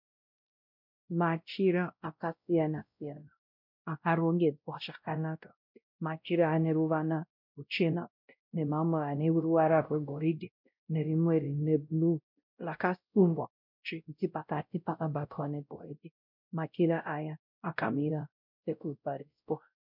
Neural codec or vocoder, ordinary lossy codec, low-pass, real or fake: codec, 16 kHz, 0.5 kbps, X-Codec, WavLM features, trained on Multilingual LibriSpeech; MP3, 48 kbps; 5.4 kHz; fake